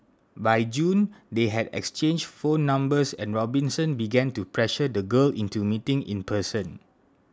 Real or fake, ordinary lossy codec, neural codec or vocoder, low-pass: real; none; none; none